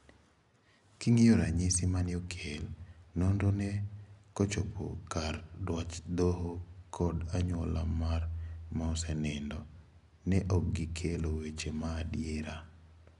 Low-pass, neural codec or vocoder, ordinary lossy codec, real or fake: 10.8 kHz; none; none; real